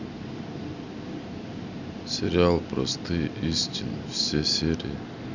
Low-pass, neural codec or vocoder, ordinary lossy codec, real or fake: 7.2 kHz; none; none; real